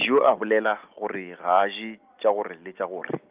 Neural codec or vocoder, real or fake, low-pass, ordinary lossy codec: none; real; 3.6 kHz; Opus, 32 kbps